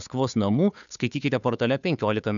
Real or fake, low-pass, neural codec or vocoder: fake; 7.2 kHz; codec, 16 kHz, 2 kbps, FunCodec, trained on Chinese and English, 25 frames a second